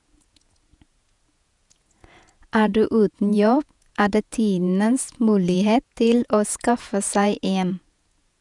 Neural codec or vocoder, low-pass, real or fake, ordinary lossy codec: vocoder, 48 kHz, 128 mel bands, Vocos; 10.8 kHz; fake; none